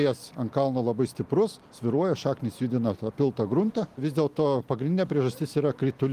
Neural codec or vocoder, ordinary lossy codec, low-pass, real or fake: none; Opus, 24 kbps; 14.4 kHz; real